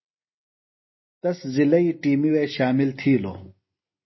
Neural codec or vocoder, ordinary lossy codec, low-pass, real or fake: none; MP3, 24 kbps; 7.2 kHz; real